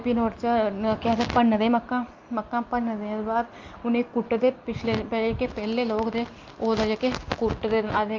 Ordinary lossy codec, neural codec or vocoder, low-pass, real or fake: Opus, 24 kbps; none; 7.2 kHz; real